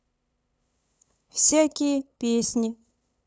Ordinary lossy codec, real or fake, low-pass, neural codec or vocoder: none; fake; none; codec, 16 kHz, 8 kbps, FunCodec, trained on LibriTTS, 25 frames a second